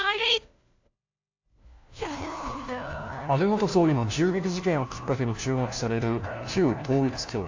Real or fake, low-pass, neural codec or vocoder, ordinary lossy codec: fake; 7.2 kHz; codec, 16 kHz, 1 kbps, FunCodec, trained on LibriTTS, 50 frames a second; AAC, 48 kbps